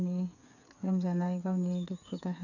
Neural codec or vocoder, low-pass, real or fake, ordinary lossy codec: codec, 16 kHz, 8 kbps, FreqCodec, smaller model; 7.2 kHz; fake; none